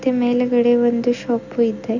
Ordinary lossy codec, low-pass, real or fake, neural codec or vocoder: none; 7.2 kHz; real; none